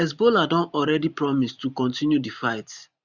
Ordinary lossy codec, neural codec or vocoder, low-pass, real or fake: none; vocoder, 44.1 kHz, 128 mel bands every 512 samples, BigVGAN v2; 7.2 kHz; fake